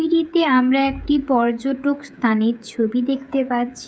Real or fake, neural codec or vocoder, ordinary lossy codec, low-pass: fake; codec, 16 kHz, 16 kbps, FreqCodec, smaller model; none; none